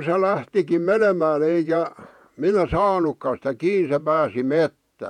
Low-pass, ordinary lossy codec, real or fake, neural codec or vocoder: 19.8 kHz; none; fake; vocoder, 48 kHz, 128 mel bands, Vocos